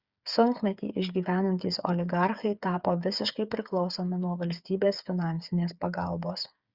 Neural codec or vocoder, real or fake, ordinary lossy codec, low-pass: codec, 16 kHz, 8 kbps, FreqCodec, smaller model; fake; Opus, 64 kbps; 5.4 kHz